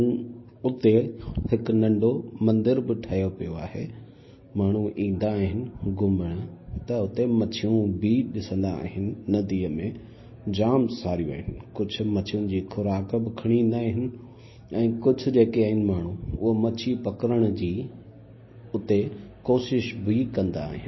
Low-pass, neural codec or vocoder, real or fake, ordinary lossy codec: 7.2 kHz; none; real; MP3, 24 kbps